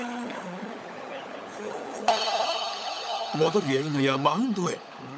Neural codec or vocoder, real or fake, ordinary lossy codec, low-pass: codec, 16 kHz, 16 kbps, FunCodec, trained on LibriTTS, 50 frames a second; fake; none; none